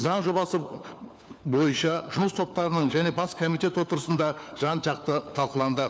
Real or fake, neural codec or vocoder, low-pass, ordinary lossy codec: fake; codec, 16 kHz, 4 kbps, FunCodec, trained on LibriTTS, 50 frames a second; none; none